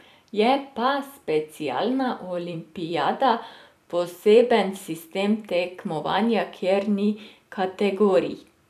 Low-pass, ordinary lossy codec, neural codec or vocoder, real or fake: 14.4 kHz; none; vocoder, 44.1 kHz, 128 mel bands every 256 samples, BigVGAN v2; fake